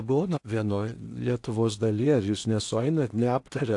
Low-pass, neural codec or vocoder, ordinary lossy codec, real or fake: 10.8 kHz; codec, 16 kHz in and 24 kHz out, 0.8 kbps, FocalCodec, streaming, 65536 codes; AAC, 64 kbps; fake